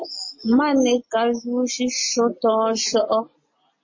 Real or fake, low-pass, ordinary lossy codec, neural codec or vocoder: real; 7.2 kHz; MP3, 32 kbps; none